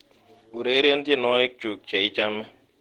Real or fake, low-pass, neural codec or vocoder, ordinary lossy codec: fake; 19.8 kHz; vocoder, 48 kHz, 128 mel bands, Vocos; Opus, 16 kbps